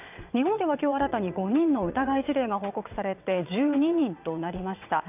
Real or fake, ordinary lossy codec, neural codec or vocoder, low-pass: fake; none; vocoder, 22.05 kHz, 80 mel bands, WaveNeXt; 3.6 kHz